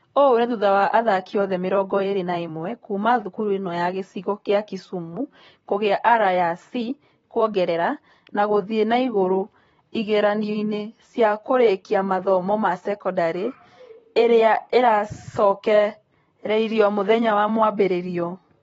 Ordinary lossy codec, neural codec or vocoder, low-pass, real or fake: AAC, 24 kbps; vocoder, 44.1 kHz, 128 mel bands, Pupu-Vocoder; 19.8 kHz; fake